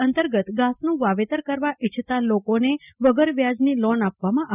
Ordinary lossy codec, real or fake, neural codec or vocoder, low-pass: none; real; none; 3.6 kHz